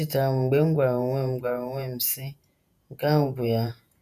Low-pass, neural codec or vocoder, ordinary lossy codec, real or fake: 14.4 kHz; vocoder, 48 kHz, 128 mel bands, Vocos; none; fake